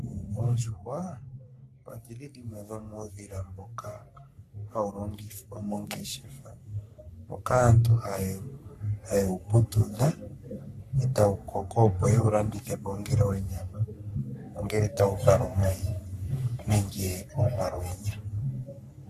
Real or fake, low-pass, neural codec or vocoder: fake; 14.4 kHz; codec, 44.1 kHz, 3.4 kbps, Pupu-Codec